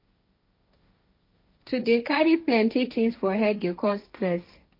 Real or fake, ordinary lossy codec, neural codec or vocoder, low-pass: fake; MP3, 32 kbps; codec, 16 kHz, 1.1 kbps, Voila-Tokenizer; 5.4 kHz